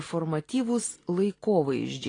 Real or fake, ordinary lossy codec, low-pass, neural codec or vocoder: real; AAC, 32 kbps; 9.9 kHz; none